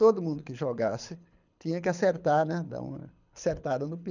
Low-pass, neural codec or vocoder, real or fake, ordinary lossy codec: 7.2 kHz; codec, 24 kHz, 6 kbps, HILCodec; fake; none